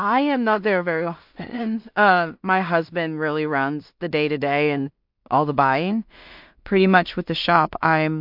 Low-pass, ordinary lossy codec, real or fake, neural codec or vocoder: 5.4 kHz; MP3, 48 kbps; fake; codec, 16 kHz in and 24 kHz out, 0.4 kbps, LongCat-Audio-Codec, two codebook decoder